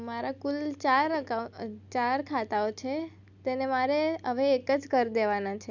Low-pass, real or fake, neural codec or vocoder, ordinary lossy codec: 7.2 kHz; real; none; none